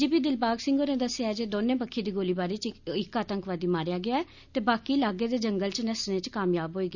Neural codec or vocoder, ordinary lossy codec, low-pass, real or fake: none; none; 7.2 kHz; real